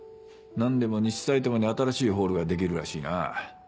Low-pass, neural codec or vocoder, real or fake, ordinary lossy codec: none; none; real; none